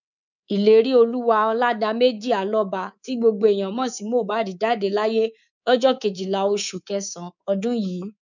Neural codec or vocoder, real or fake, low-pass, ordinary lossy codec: codec, 24 kHz, 3.1 kbps, DualCodec; fake; 7.2 kHz; none